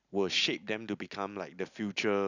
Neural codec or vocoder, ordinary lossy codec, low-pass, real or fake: none; none; 7.2 kHz; real